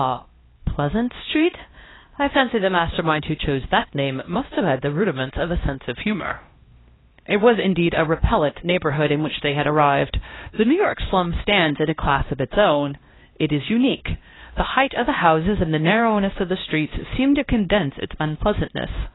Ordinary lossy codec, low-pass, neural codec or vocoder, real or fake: AAC, 16 kbps; 7.2 kHz; codec, 16 kHz, 1 kbps, X-Codec, HuBERT features, trained on LibriSpeech; fake